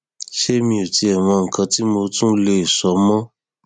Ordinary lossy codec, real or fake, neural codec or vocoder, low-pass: none; real; none; 9.9 kHz